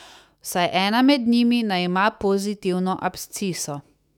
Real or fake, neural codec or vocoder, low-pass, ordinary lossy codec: fake; autoencoder, 48 kHz, 128 numbers a frame, DAC-VAE, trained on Japanese speech; 19.8 kHz; none